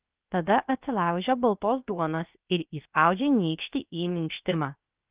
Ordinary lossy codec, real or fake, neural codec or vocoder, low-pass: Opus, 24 kbps; fake; codec, 16 kHz, 0.7 kbps, FocalCodec; 3.6 kHz